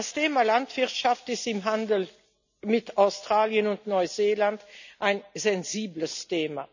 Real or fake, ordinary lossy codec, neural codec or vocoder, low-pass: real; none; none; 7.2 kHz